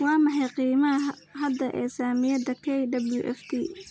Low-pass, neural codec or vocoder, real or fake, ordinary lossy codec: none; none; real; none